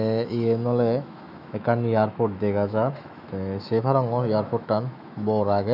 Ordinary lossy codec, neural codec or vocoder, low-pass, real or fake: none; autoencoder, 48 kHz, 128 numbers a frame, DAC-VAE, trained on Japanese speech; 5.4 kHz; fake